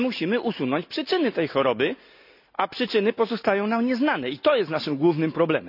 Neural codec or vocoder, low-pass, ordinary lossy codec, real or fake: none; 5.4 kHz; none; real